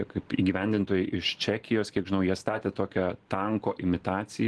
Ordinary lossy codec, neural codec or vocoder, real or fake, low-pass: Opus, 16 kbps; none; real; 10.8 kHz